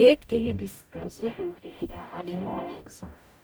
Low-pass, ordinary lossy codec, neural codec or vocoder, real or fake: none; none; codec, 44.1 kHz, 0.9 kbps, DAC; fake